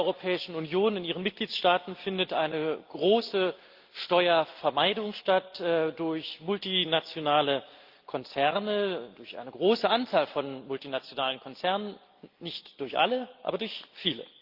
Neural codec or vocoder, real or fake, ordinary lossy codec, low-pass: none; real; Opus, 32 kbps; 5.4 kHz